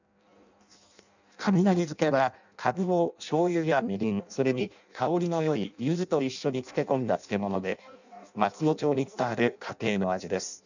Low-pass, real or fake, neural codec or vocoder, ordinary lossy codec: 7.2 kHz; fake; codec, 16 kHz in and 24 kHz out, 0.6 kbps, FireRedTTS-2 codec; none